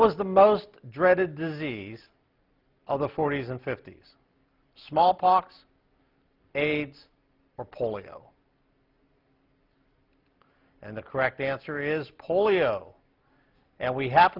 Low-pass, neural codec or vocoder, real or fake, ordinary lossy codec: 5.4 kHz; none; real; Opus, 24 kbps